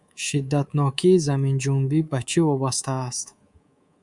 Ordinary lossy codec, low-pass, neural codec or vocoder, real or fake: Opus, 64 kbps; 10.8 kHz; codec, 24 kHz, 3.1 kbps, DualCodec; fake